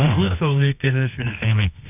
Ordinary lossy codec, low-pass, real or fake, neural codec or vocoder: none; 3.6 kHz; fake; codec, 24 kHz, 0.9 kbps, WavTokenizer, medium music audio release